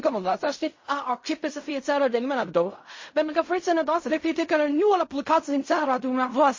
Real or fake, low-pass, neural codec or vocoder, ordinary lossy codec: fake; 7.2 kHz; codec, 16 kHz in and 24 kHz out, 0.4 kbps, LongCat-Audio-Codec, fine tuned four codebook decoder; MP3, 32 kbps